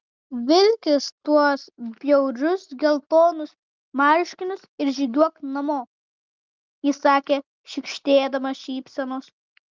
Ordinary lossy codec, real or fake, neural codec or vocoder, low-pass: Opus, 32 kbps; real; none; 7.2 kHz